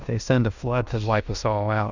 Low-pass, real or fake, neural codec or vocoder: 7.2 kHz; fake; codec, 16 kHz in and 24 kHz out, 0.9 kbps, LongCat-Audio-Codec, fine tuned four codebook decoder